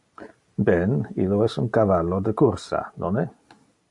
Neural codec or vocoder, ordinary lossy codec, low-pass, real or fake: none; AAC, 64 kbps; 10.8 kHz; real